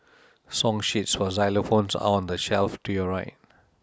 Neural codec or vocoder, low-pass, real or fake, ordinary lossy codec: none; none; real; none